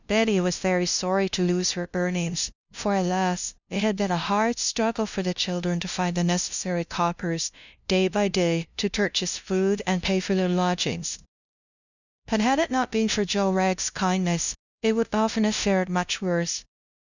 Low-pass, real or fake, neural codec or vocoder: 7.2 kHz; fake; codec, 16 kHz, 0.5 kbps, FunCodec, trained on LibriTTS, 25 frames a second